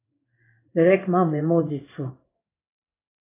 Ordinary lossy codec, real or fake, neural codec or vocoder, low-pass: AAC, 24 kbps; fake; codec, 16 kHz in and 24 kHz out, 1 kbps, XY-Tokenizer; 3.6 kHz